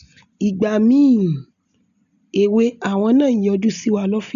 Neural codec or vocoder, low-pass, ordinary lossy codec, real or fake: none; 7.2 kHz; none; real